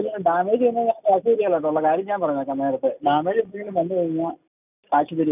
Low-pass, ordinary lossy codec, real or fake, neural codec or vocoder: 3.6 kHz; none; real; none